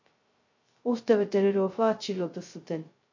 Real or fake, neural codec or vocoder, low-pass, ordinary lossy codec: fake; codec, 16 kHz, 0.2 kbps, FocalCodec; 7.2 kHz; MP3, 48 kbps